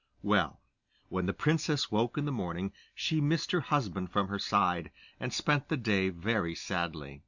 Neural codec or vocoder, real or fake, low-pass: none; real; 7.2 kHz